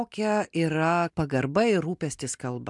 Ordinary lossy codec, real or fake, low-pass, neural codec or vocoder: MP3, 96 kbps; real; 10.8 kHz; none